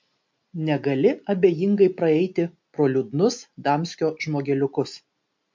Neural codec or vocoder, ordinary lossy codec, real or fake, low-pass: none; MP3, 48 kbps; real; 7.2 kHz